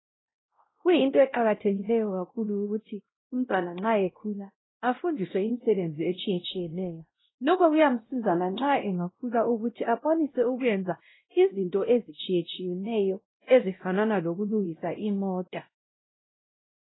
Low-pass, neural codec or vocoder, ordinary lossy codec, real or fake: 7.2 kHz; codec, 16 kHz, 0.5 kbps, X-Codec, WavLM features, trained on Multilingual LibriSpeech; AAC, 16 kbps; fake